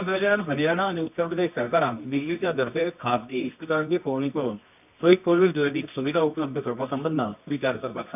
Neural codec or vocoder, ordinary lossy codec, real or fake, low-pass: codec, 24 kHz, 0.9 kbps, WavTokenizer, medium music audio release; none; fake; 3.6 kHz